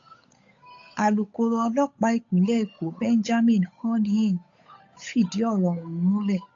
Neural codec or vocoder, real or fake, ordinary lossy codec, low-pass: codec, 16 kHz, 8 kbps, FunCodec, trained on Chinese and English, 25 frames a second; fake; AAC, 48 kbps; 7.2 kHz